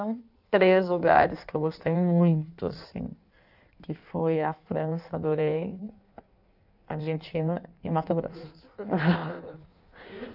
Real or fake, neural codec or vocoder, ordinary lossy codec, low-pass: fake; codec, 16 kHz in and 24 kHz out, 1.1 kbps, FireRedTTS-2 codec; none; 5.4 kHz